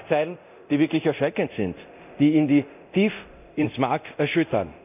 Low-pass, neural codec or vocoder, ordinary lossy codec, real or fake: 3.6 kHz; codec, 24 kHz, 0.9 kbps, DualCodec; none; fake